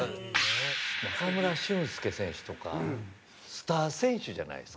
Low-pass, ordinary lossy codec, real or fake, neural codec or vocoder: none; none; real; none